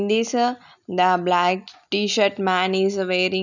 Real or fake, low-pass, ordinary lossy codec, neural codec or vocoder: real; 7.2 kHz; none; none